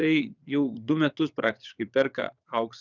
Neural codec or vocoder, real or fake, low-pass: vocoder, 22.05 kHz, 80 mel bands, WaveNeXt; fake; 7.2 kHz